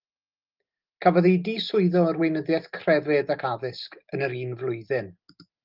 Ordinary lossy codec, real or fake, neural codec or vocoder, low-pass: Opus, 32 kbps; real; none; 5.4 kHz